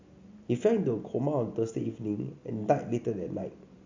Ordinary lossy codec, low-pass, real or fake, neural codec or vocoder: MP3, 64 kbps; 7.2 kHz; real; none